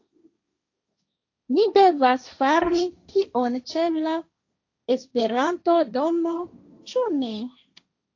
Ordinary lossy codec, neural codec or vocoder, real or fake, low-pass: AAC, 48 kbps; codec, 16 kHz, 1.1 kbps, Voila-Tokenizer; fake; 7.2 kHz